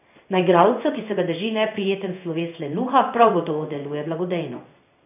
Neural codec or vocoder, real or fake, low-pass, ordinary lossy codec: codec, 16 kHz in and 24 kHz out, 1 kbps, XY-Tokenizer; fake; 3.6 kHz; none